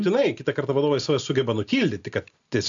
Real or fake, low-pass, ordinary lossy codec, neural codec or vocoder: real; 7.2 kHz; AAC, 64 kbps; none